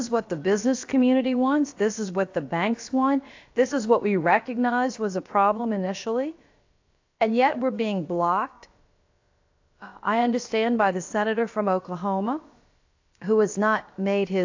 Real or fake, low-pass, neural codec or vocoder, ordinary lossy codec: fake; 7.2 kHz; codec, 16 kHz, about 1 kbps, DyCAST, with the encoder's durations; AAC, 48 kbps